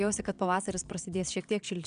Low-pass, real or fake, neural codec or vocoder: 9.9 kHz; fake; vocoder, 22.05 kHz, 80 mel bands, Vocos